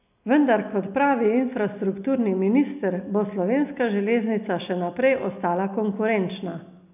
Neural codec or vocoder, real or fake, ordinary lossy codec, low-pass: none; real; none; 3.6 kHz